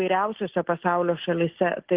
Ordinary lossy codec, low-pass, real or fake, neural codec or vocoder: Opus, 16 kbps; 3.6 kHz; real; none